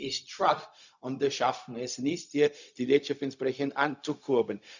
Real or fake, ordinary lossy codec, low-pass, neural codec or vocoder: fake; none; 7.2 kHz; codec, 16 kHz, 0.4 kbps, LongCat-Audio-Codec